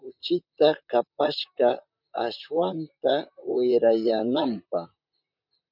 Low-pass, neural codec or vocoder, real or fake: 5.4 kHz; vocoder, 44.1 kHz, 128 mel bands, Pupu-Vocoder; fake